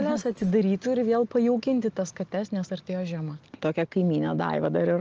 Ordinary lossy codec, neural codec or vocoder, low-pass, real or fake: Opus, 24 kbps; none; 7.2 kHz; real